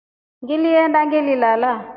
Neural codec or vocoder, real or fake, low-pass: none; real; 5.4 kHz